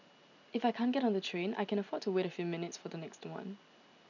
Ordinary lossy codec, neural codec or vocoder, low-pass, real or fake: none; none; 7.2 kHz; real